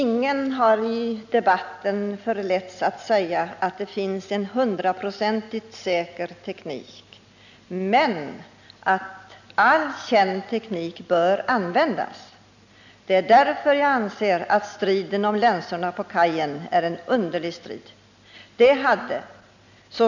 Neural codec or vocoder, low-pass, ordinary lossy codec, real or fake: none; 7.2 kHz; none; real